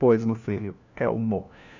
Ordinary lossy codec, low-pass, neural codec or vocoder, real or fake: none; 7.2 kHz; codec, 16 kHz, 1 kbps, FunCodec, trained on LibriTTS, 50 frames a second; fake